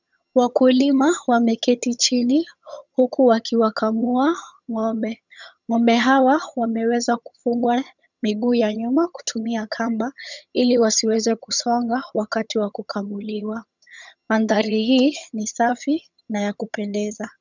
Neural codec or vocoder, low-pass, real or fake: vocoder, 22.05 kHz, 80 mel bands, HiFi-GAN; 7.2 kHz; fake